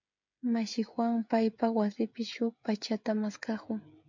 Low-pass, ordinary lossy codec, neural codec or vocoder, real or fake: 7.2 kHz; MP3, 64 kbps; codec, 16 kHz, 16 kbps, FreqCodec, smaller model; fake